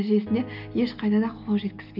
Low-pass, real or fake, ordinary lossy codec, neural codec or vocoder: 5.4 kHz; real; none; none